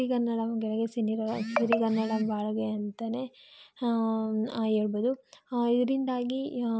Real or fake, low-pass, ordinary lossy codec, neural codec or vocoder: real; none; none; none